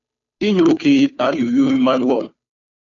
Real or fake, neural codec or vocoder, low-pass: fake; codec, 16 kHz, 2 kbps, FunCodec, trained on Chinese and English, 25 frames a second; 7.2 kHz